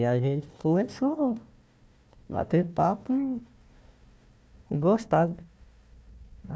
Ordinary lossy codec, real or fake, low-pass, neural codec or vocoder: none; fake; none; codec, 16 kHz, 1 kbps, FunCodec, trained on Chinese and English, 50 frames a second